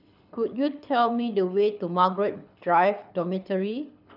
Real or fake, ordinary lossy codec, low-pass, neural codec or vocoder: fake; none; 5.4 kHz; codec, 24 kHz, 6 kbps, HILCodec